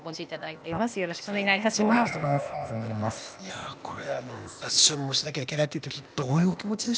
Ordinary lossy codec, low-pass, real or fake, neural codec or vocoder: none; none; fake; codec, 16 kHz, 0.8 kbps, ZipCodec